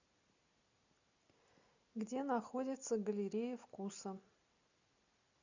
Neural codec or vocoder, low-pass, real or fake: none; 7.2 kHz; real